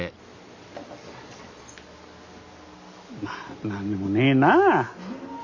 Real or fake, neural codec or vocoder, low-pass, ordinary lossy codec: real; none; 7.2 kHz; Opus, 64 kbps